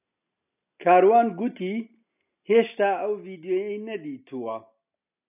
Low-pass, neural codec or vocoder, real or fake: 3.6 kHz; none; real